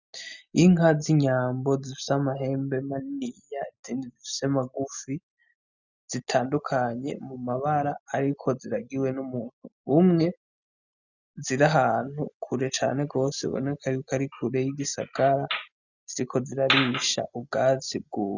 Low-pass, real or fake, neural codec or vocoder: 7.2 kHz; real; none